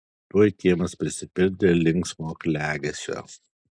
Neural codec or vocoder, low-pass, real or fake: none; 9.9 kHz; real